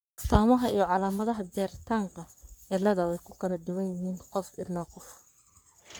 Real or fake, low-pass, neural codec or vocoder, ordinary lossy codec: fake; none; codec, 44.1 kHz, 3.4 kbps, Pupu-Codec; none